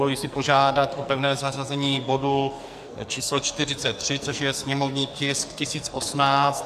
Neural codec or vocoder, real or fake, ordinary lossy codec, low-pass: codec, 44.1 kHz, 2.6 kbps, SNAC; fake; AAC, 96 kbps; 14.4 kHz